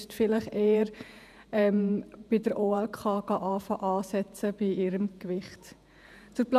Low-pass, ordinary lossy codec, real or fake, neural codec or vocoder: 14.4 kHz; none; fake; vocoder, 48 kHz, 128 mel bands, Vocos